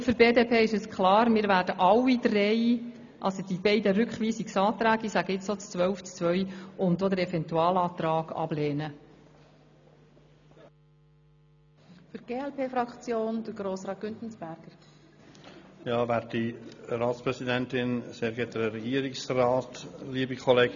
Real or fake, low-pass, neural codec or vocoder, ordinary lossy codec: real; 7.2 kHz; none; none